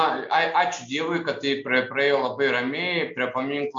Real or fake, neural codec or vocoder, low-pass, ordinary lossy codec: real; none; 7.2 kHz; MP3, 48 kbps